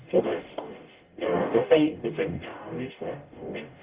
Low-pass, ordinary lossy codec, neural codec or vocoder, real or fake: 3.6 kHz; Opus, 32 kbps; codec, 44.1 kHz, 0.9 kbps, DAC; fake